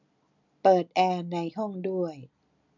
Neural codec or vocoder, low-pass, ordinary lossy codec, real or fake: none; 7.2 kHz; none; real